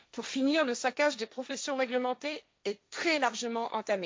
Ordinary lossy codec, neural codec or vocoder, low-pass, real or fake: none; codec, 16 kHz, 1.1 kbps, Voila-Tokenizer; none; fake